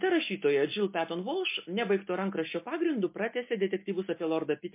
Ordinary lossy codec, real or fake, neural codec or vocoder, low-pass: MP3, 24 kbps; real; none; 3.6 kHz